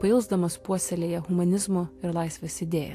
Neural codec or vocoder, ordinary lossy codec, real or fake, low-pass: none; AAC, 64 kbps; real; 14.4 kHz